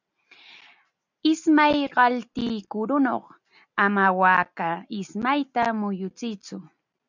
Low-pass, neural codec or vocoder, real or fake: 7.2 kHz; none; real